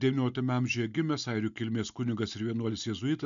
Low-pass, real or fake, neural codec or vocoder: 7.2 kHz; real; none